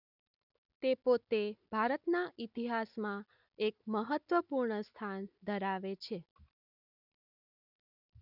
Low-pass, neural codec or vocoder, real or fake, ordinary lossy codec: 5.4 kHz; none; real; none